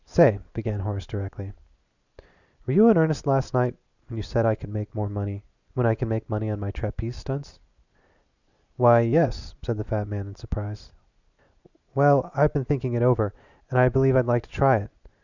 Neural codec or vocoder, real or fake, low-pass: none; real; 7.2 kHz